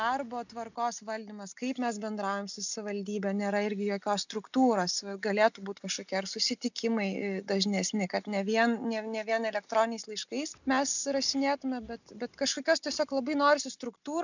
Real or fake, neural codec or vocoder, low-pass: real; none; 7.2 kHz